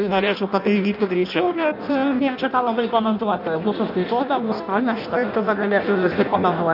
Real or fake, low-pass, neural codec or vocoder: fake; 5.4 kHz; codec, 16 kHz in and 24 kHz out, 0.6 kbps, FireRedTTS-2 codec